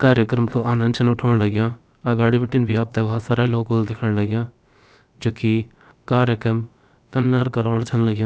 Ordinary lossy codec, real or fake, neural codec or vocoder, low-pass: none; fake; codec, 16 kHz, about 1 kbps, DyCAST, with the encoder's durations; none